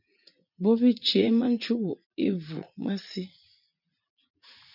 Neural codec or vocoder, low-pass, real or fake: vocoder, 44.1 kHz, 80 mel bands, Vocos; 5.4 kHz; fake